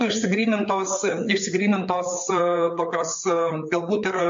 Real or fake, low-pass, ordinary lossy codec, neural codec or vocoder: fake; 7.2 kHz; MP3, 48 kbps; codec, 16 kHz, 4 kbps, FreqCodec, larger model